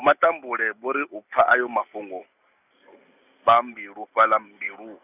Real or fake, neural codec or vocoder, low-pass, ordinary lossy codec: real; none; 3.6 kHz; none